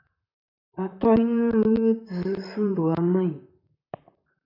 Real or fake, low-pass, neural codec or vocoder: fake; 5.4 kHz; vocoder, 44.1 kHz, 128 mel bands, Pupu-Vocoder